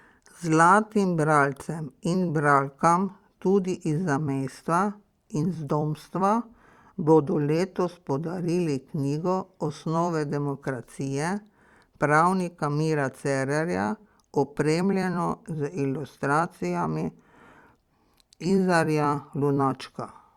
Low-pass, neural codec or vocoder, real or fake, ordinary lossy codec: 19.8 kHz; vocoder, 44.1 kHz, 128 mel bands every 256 samples, BigVGAN v2; fake; Opus, 64 kbps